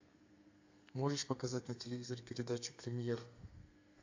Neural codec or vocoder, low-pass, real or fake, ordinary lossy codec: codec, 32 kHz, 1.9 kbps, SNAC; 7.2 kHz; fake; AAC, 48 kbps